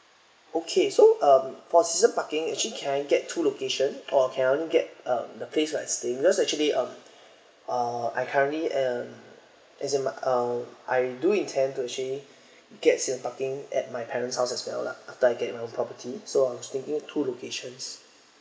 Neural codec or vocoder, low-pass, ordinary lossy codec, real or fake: none; none; none; real